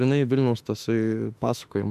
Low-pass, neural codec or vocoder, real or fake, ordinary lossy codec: 14.4 kHz; autoencoder, 48 kHz, 32 numbers a frame, DAC-VAE, trained on Japanese speech; fake; AAC, 96 kbps